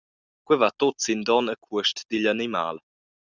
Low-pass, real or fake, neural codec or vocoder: 7.2 kHz; real; none